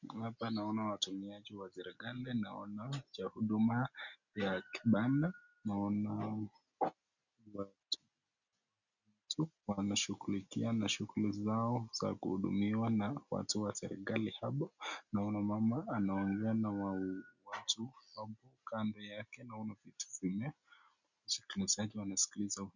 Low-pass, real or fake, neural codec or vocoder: 7.2 kHz; real; none